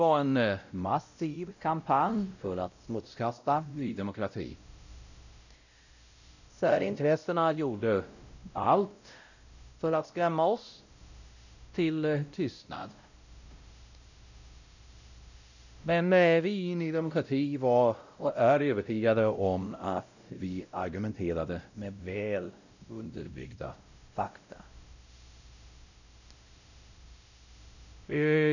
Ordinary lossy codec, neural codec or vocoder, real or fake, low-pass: none; codec, 16 kHz, 0.5 kbps, X-Codec, WavLM features, trained on Multilingual LibriSpeech; fake; 7.2 kHz